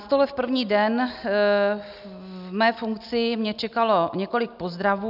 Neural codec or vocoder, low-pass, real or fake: none; 5.4 kHz; real